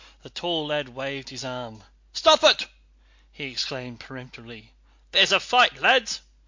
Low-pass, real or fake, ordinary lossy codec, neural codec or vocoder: 7.2 kHz; real; MP3, 48 kbps; none